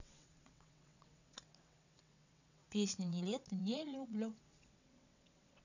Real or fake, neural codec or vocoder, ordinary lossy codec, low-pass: fake; vocoder, 22.05 kHz, 80 mel bands, WaveNeXt; none; 7.2 kHz